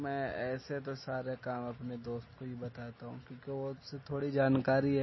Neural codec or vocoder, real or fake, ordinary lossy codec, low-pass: none; real; MP3, 24 kbps; 7.2 kHz